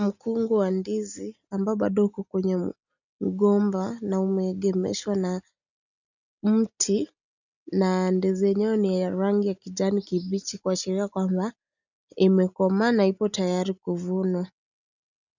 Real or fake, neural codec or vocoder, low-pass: real; none; 7.2 kHz